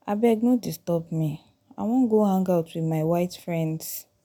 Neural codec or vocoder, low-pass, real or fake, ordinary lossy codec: none; 19.8 kHz; real; none